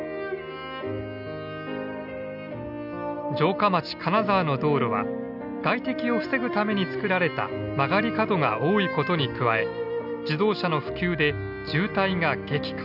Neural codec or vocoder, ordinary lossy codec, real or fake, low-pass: none; none; real; 5.4 kHz